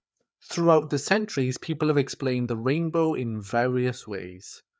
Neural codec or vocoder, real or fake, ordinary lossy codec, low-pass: codec, 16 kHz, 4 kbps, FreqCodec, larger model; fake; none; none